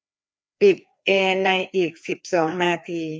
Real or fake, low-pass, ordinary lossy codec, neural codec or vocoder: fake; none; none; codec, 16 kHz, 2 kbps, FreqCodec, larger model